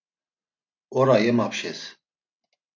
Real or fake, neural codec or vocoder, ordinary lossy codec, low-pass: real; none; AAC, 48 kbps; 7.2 kHz